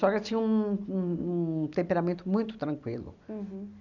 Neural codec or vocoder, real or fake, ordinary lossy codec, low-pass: none; real; none; 7.2 kHz